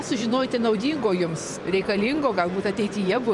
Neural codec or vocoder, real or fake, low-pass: vocoder, 24 kHz, 100 mel bands, Vocos; fake; 10.8 kHz